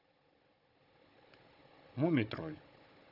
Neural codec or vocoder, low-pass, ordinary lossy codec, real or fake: codec, 16 kHz, 16 kbps, FunCodec, trained on Chinese and English, 50 frames a second; 5.4 kHz; none; fake